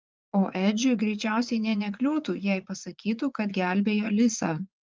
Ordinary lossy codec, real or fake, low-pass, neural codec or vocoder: Opus, 32 kbps; fake; 7.2 kHz; vocoder, 44.1 kHz, 80 mel bands, Vocos